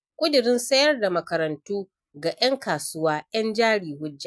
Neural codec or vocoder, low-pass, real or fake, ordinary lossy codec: none; none; real; none